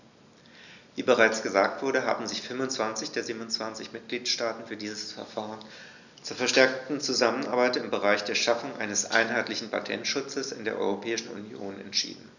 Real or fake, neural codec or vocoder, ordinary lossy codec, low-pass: real; none; none; 7.2 kHz